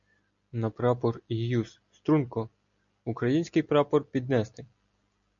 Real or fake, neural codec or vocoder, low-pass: real; none; 7.2 kHz